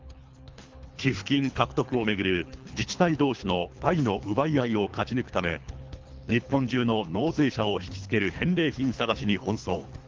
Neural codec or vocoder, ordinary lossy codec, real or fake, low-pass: codec, 24 kHz, 3 kbps, HILCodec; Opus, 32 kbps; fake; 7.2 kHz